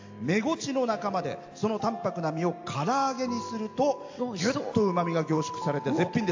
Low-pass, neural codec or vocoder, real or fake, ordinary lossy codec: 7.2 kHz; none; real; AAC, 48 kbps